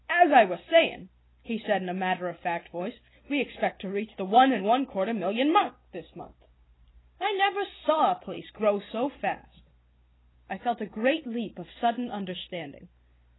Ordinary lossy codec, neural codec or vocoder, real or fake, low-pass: AAC, 16 kbps; none; real; 7.2 kHz